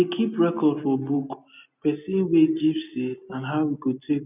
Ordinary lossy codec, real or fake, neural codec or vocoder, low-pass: none; real; none; 3.6 kHz